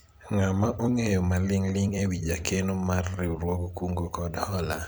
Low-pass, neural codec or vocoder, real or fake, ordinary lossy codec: none; vocoder, 44.1 kHz, 128 mel bands every 256 samples, BigVGAN v2; fake; none